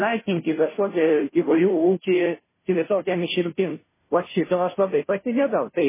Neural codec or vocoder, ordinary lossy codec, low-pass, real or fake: codec, 16 kHz, 0.5 kbps, FunCodec, trained on Chinese and English, 25 frames a second; MP3, 16 kbps; 3.6 kHz; fake